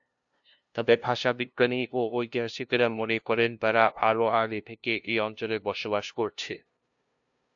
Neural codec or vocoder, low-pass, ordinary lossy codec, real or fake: codec, 16 kHz, 0.5 kbps, FunCodec, trained on LibriTTS, 25 frames a second; 7.2 kHz; AAC, 64 kbps; fake